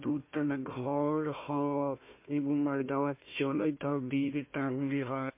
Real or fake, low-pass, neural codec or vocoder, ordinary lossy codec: fake; 3.6 kHz; codec, 16 kHz, 1 kbps, FunCodec, trained on Chinese and English, 50 frames a second; MP3, 24 kbps